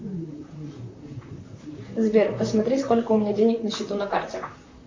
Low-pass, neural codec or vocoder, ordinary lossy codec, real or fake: 7.2 kHz; vocoder, 44.1 kHz, 128 mel bands, Pupu-Vocoder; MP3, 64 kbps; fake